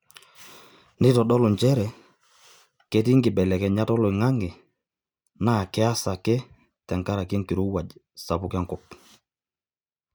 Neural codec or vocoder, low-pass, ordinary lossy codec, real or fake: none; none; none; real